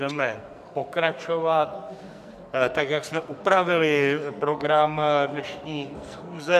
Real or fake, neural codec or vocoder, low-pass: fake; codec, 44.1 kHz, 2.6 kbps, SNAC; 14.4 kHz